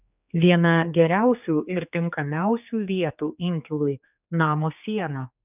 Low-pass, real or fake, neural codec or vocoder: 3.6 kHz; fake; codec, 16 kHz, 4 kbps, X-Codec, HuBERT features, trained on general audio